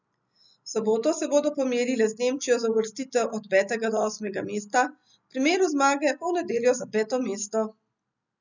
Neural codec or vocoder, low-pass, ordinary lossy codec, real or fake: none; 7.2 kHz; none; real